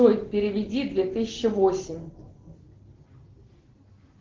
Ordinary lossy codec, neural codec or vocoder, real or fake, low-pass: Opus, 16 kbps; none; real; 7.2 kHz